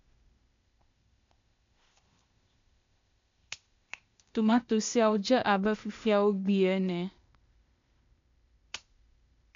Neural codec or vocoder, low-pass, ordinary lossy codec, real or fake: codec, 16 kHz, 0.8 kbps, ZipCodec; 7.2 kHz; MP3, 64 kbps; fake